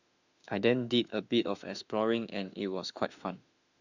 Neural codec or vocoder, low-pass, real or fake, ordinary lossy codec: autoencoder, 48 kHz, 32 numbers a frame, DAC-VAE, trained on Japanese speech; 7.2 kHz; fake; none